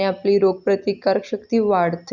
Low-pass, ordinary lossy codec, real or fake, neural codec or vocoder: 7.2 kHz; Opus, 64 kbps; real; none